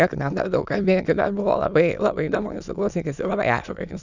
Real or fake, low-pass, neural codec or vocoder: fake; 7.2 kHz; autoencoder, 22.05 kHz, a latent of 192 numbers a frame, VITS, trained on many speakers